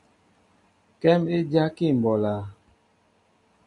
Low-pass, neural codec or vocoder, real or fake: 10.8 kHz; none; real